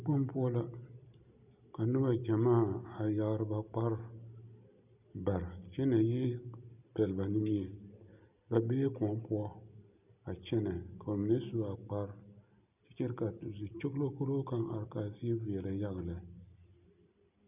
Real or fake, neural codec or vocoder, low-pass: real; none; 3.6 kHz